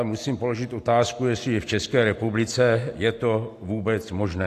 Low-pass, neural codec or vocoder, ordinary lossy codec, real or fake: 14.4 kHz; none; AAC, 64 kbps; real